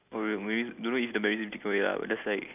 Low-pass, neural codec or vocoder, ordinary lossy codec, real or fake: 3.6 kHz; none; none; real